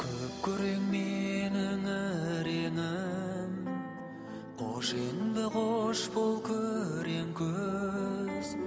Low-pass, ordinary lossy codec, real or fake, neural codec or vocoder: none; none; real; none